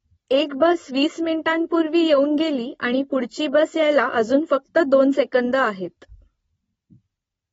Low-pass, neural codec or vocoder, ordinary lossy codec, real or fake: 19.8 kHz; none; AAC, 24 kbps; real